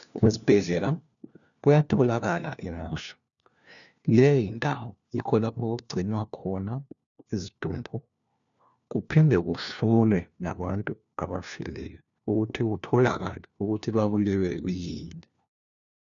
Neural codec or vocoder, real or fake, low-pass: codec, 16 kHz, 1 kbps, FunCodec, trained on LibriTTS, 50 frames a second; fake; 7.2 kHz